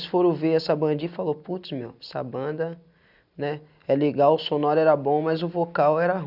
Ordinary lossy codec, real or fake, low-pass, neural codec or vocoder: none; real; 5.4 kHz; none